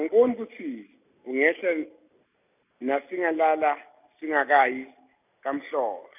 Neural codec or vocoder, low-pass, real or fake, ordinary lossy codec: none; 3.6 kHz; real; none